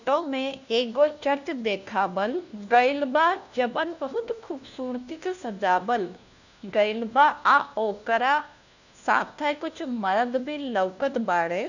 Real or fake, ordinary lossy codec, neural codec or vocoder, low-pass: fake; none; codec, 16 kHz, 1 kbps, FunCodec, trained on LibriTTS, 50 frames a second; 7.2 kHz